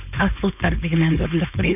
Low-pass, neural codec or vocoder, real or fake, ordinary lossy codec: 3.6 kHz; codec, 16 kHz, 4.8 kbps, FACodec; fake; none